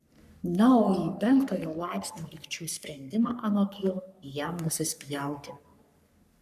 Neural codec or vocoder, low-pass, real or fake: codec, 44.1 kHz, 3.4 kbps, Pupu-Codec; 14.4 kHz; fake